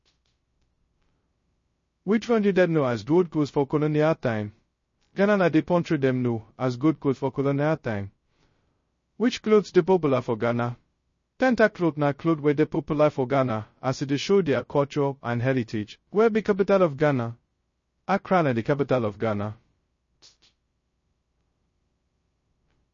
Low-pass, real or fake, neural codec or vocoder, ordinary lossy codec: 7.2 kHz; fake; codec, 16 kHz, 0.2 kbps, FocalCodec; MP3, 32 kbps